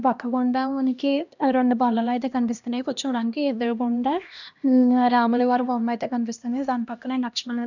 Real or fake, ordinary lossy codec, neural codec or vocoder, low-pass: fake; none; codec, 16 kHz, 1 kbps, X-Codec, HuBERT features, trained on LibriSpeech; 7.2 kHz